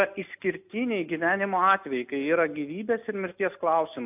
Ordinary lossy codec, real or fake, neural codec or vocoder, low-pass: AAC, 32 kbps; real; none; 3.6 kHz